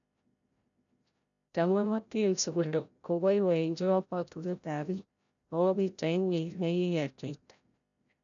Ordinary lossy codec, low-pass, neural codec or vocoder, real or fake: none; 7.2 kHz; codec, 16 kHz, 0.5 kbps, FreqCodec, larger model; fake